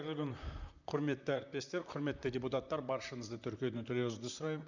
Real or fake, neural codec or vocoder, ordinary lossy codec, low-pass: fake; vocoder, 44.1 kHz, 128 mel bands, Pupu-Vocoder; none; 7.2 kHz